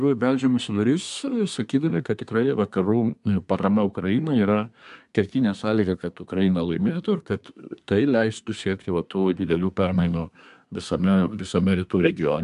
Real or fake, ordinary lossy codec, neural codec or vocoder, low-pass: fake; MP3, 96 kbps; codec, 24 kHz, 1 kbps, SNAC; 10.8 kHz